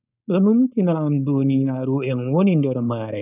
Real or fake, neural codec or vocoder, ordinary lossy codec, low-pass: fake; codec, 16 kHz, 4.8 kbps, FACodec; none; 3.6 kHz